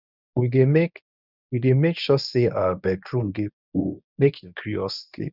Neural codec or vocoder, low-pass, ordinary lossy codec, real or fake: codec, 24 kHz, 0.9 kbps, WavTokenizer, medium speech release version 1; 5.4 kHz; none; fake